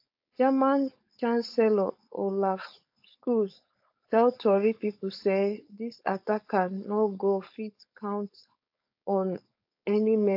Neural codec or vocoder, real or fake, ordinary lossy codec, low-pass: codec, 16 kHz, 4.8 kbps, FACodec; fake; AAC, 48 kbps; 5.4 kHz